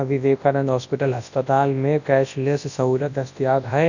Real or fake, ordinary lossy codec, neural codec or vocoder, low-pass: fake; none; codec, 24 kHz, 0.9 kbps, WavTokenizer, large speech release; 7.2 kHz